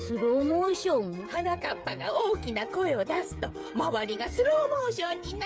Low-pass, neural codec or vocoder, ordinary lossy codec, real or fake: none; codec, 16 kHz, 8 kbps, FreqCodec, smaller model; none; fake